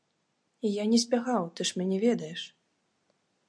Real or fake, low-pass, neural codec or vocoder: real; 9.9 kHz; none